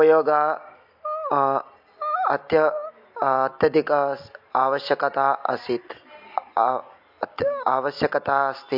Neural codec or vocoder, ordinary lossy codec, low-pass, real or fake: none; MP3, 48 kbps; 5.4 kHz; real